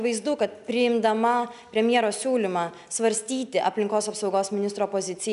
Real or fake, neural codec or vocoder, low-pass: real; none; 10.8 kHz